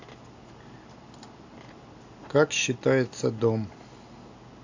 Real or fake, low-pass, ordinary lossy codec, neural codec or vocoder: real; 7.2 kHz; AAC, 48 kbps; none